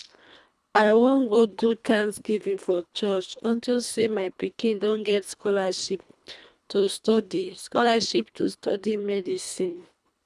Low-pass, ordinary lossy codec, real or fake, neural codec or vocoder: none; none; fake; codec, 24 kHz, 1.5 kbps, HILCodec